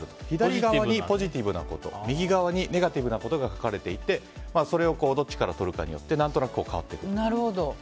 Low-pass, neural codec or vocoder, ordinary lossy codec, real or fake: none; none; none; real